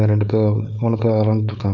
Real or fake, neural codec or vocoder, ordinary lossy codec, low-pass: fake; codec, 16 kHz, 4.8 kbps, FACodec; none; 7.2 kHz